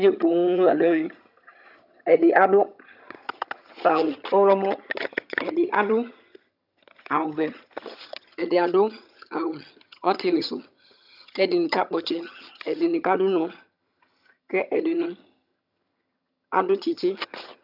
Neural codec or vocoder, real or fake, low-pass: vocoder, 22.05 kHz, 80 mel bands, HiFi-GAN; fake; 5.4 kHz